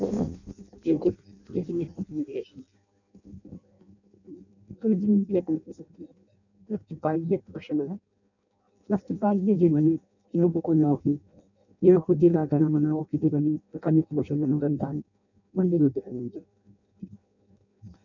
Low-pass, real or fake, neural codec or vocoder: 7.2 kHz; fake; codec, 16 kHz in and 24 kHz out, 0.6 kbps, FireRedTTS-2 codec